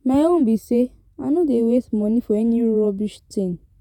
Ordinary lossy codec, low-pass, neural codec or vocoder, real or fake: none; 19.8 kHz; vocoder, 48 kHz, 128 mel bands, Vocos; fake